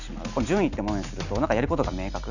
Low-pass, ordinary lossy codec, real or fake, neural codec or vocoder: 7.2 kHz; none; real; none